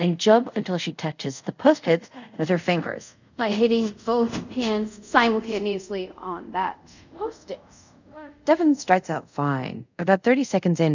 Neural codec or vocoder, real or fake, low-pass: codec, 24 kHz, 0.5 kbps, DualCodec; fake; 7.2 kHz